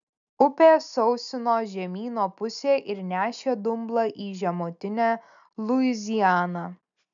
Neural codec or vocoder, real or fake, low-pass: none; real; 7.2 kHz